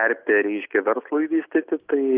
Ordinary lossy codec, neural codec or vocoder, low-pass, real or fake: Opus, 32 kbps; none; 3.6 kHz; real